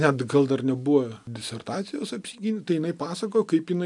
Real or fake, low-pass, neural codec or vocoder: real; 10.8 kHz; none